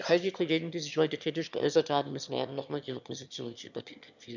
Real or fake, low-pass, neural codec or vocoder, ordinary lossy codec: fake; 7.2 kHz; autoencoder, 22.05 kHz, a latent of 192 numbers a frame, VITS, trained on one speaker; none